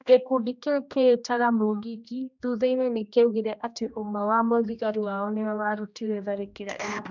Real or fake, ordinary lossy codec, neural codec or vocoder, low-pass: fake; none; codec, 16 kHz, 1 kbps, X-Codec, HuBERT features, trained on general audio; 7.2 kHz